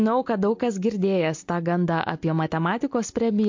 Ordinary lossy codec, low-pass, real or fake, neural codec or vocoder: MP3, 48 kbps; 7.2 kHz; real; none